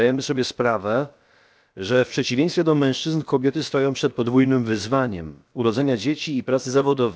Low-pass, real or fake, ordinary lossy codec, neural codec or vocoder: none; fake; none; codec, 16 kHz, about 1 kbps, DyCAST, with the encoder's durations